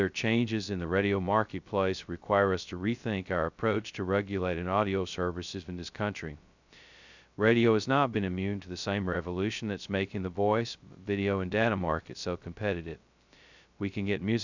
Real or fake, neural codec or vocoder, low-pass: fake; codec, 16 kHz, 0.2 kbps, FocalCodec; 7.2 kHz